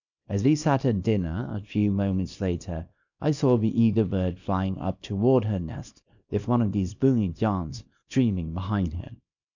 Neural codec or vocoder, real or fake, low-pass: codec, 24 kHz, 0.9 kbps, WavTokenizer, small release; fake; 7.2 kHz